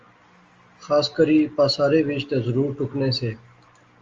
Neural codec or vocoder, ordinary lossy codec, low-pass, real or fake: none; Opus, 32 kbps; 7.2 kHz; real